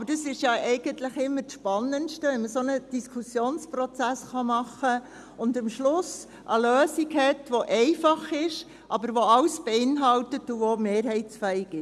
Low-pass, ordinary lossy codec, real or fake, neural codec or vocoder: none; none; real; none